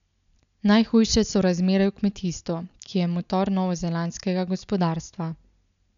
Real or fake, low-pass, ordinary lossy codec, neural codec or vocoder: real; 7.2 kHz; none; none